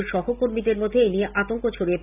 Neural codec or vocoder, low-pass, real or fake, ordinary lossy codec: none; 3.6 kHz; real; AAC, 32 kbps